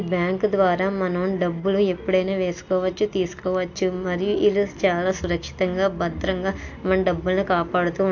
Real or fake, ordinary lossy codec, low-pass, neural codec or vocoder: real; AAC, 48 kbps; 7.2 kHz; none